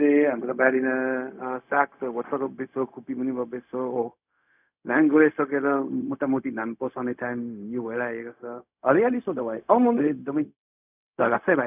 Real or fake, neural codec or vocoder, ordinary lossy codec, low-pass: fake; codec, 16 kHz, 0.4 kbps, LongCat-Audio-Codec; none; 3.6 kHz